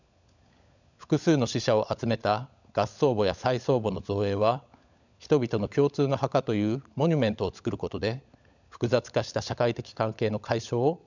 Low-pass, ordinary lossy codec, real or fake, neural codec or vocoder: 7.2 kHz; none; fake; codec, 16 kHz, 16 kbps, FunCodec, trained on LibriTTS, 50 frames a second